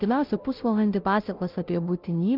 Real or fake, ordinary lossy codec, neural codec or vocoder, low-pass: fake; Opus, 32 kbps; codec, 16 kHz, 0.5 kbps, FunCodec, trained on Chinese and English, 25 frames a second; 5.4 kHz